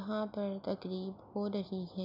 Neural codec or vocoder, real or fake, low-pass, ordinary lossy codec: none; real; 5.4 kHz; none